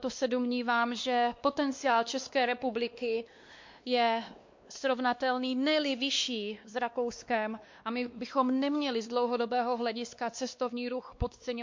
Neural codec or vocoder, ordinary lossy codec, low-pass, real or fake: codec, 16 kHz, 2 kbps, X-Codec, WavLM features, trained on Multilingual LibriSpeech; MP3, 48 kbps; 7.2 kHz; fake